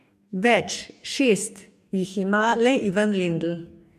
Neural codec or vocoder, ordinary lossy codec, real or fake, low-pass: codec, 44.1 kHz, 2.6 kbps, DAC; none; fake; 14.4 kHz